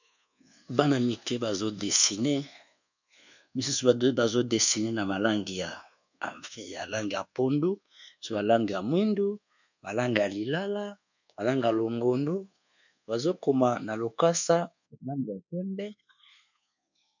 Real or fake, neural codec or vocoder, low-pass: fake; codec, 24 kHz, 1.2 kbps, DualCodec; 7.2 kHz